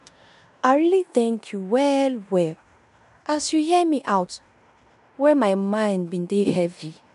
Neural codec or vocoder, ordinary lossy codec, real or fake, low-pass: codec, 16 kHz in and 24 kHz out, 0.9 kbps, LongCat-Audio-Codec, fine tuned four codebook decoder; none; fake; 10.8 kHz